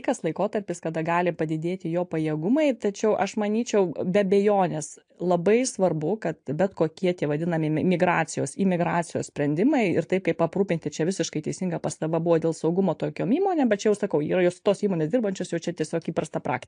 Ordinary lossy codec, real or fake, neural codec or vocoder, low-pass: AAC, 64 kbps; real; none; 9.9 kHz